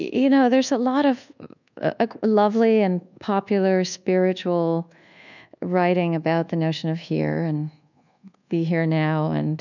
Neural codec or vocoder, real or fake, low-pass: codec, 24 kHz, 1.2 kbps, DualCodec; fake; 7.2 kHz